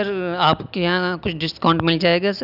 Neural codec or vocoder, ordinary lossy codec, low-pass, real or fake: none; none; 5.4 kHz; real